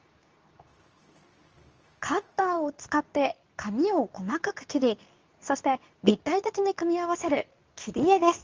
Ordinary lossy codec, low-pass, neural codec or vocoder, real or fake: Opus, 24 kbps; 7.2 kHz; codec, 24 kHz, 0.9 kbps, WavTokenizer, medium speech release version 2; fake